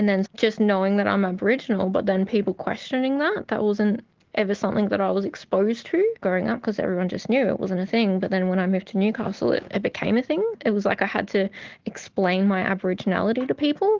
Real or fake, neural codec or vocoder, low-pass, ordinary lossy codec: real; none; 7.2 kHz; Opus, 16 kbps